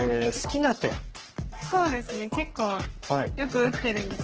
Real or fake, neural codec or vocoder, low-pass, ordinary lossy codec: fake; codec, 44.1 kHz, 3.4 kbps, Pupu-Codec; 7.2 kHz; Opus, 16 kbps